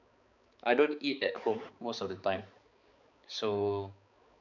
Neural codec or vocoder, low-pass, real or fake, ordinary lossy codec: codec, 16 kHz, 4 kbps, X-Codec, HuBERT features, trained on balanced general audio; 7.2 kHz; fake; none